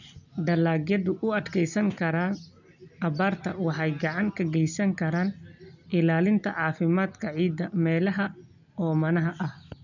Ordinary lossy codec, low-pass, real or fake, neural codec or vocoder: none; 7.2 kHz; real; none